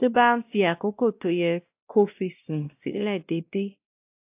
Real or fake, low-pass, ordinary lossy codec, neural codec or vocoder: fake; 3.6 kHz; AAC, 32 kbps; codec, 16 kHz, 1 kbps, X-Codec, WavLM features, trained on Multilingual LibriSpeech